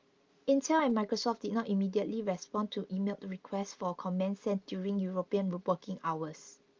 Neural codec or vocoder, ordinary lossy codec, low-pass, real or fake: none; Opus, 32 kbps; 7.2 kHz; real